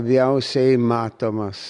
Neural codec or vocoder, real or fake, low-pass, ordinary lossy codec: none; real; 10.8 kHz; Opus, 64 kbps